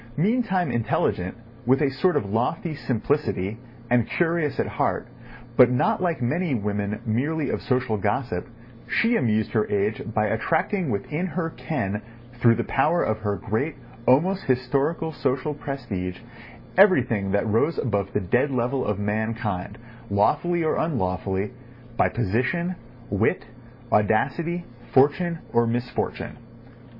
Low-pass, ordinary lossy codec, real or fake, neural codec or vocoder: 5.4 kHz; MP3, 24 kbps; real; none